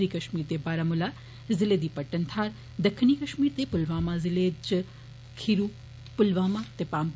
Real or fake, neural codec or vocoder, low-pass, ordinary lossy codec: real; none; none; none